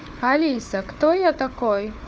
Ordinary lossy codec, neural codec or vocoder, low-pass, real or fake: none; codec, 16 kHz, 4 kbps, FunCodec, trained on Chinese and English, 50 frames a second; none; fake